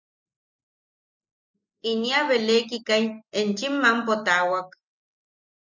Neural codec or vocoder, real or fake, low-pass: none; real; 7.2 kHz